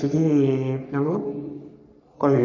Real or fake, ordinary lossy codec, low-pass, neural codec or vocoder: fake; none; 7.2 kHz; codec, 44.1 kHz, 3.4 kbps, Pupu-Codec